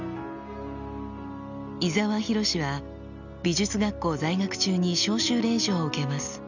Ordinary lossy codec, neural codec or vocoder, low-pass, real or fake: none; none; 7.2 kHz; real